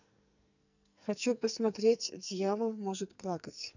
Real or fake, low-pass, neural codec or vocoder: fake; 7.2 kHz; codec, 44.1 kHz, 2.6 kbps, SNAC